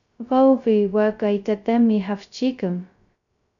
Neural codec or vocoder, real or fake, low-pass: codec, 16 kHz, 0.2 kbps, FocalCodec; fake; 7.2 kHz